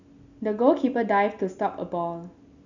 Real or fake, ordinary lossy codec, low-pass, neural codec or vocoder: real; none; 7.2 kHz; none